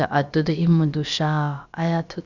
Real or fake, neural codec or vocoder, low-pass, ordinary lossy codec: fake; codec, 16 kHz, about 1 kbps, DyCAST, with the encoder's durations; 7.2 kHz; Opus, 64 kbps